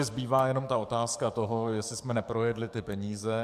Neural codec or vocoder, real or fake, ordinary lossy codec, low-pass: codec, 44.1 kHz, 7.8 kbps, DAC; fake; AAC, 96 kbps; 14.4 kHz